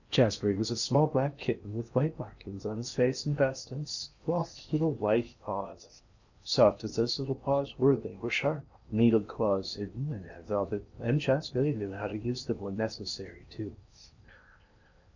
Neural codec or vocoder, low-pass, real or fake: codec, 16 kHz in and 24 kHz out, 0.8 kbps, FocalCodec, streaming, 65536 codes; 7.2 kHz; fake